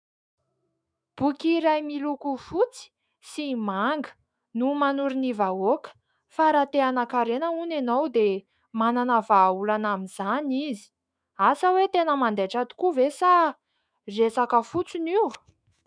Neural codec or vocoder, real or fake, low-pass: autoencoder, 48 kHz, 128 numbers a frame, DAC-VAE, trained on Japanese speech; fake; 9.9 kHz